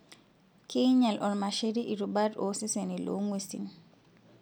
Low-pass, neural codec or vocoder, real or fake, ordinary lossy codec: none; none; real; none